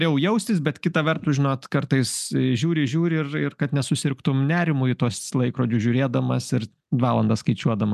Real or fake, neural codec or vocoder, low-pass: real; none; 14.4 kHz